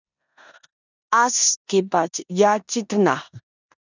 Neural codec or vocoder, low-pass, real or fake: codec, 16 kHz in and 24 kHz out, 0.9 kbps, LongCat-Audio-Codec, four codebook decoder; 7.2 kHz; fake